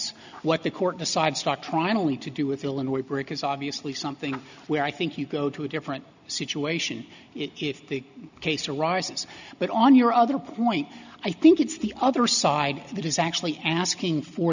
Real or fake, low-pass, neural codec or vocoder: real; 7.2 kHz; none